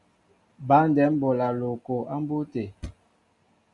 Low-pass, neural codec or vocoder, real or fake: 10.8 kHz; none; real